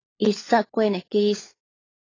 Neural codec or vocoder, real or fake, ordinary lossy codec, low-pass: codec, 16 kHz, 16 kbps, FunCodec, trained on LibriTTS, 50 frames a second; fake; AAC, 32 kbps; 7.2 kHz